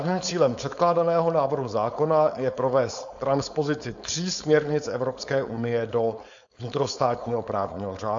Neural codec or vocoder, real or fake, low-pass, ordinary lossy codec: codec, 16 kHz, 4.8 kbps, FACodec; fake; 7.2 kHz; AAC, 64 kbps